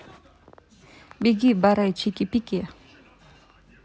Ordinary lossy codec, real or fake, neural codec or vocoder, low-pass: none; real; none; none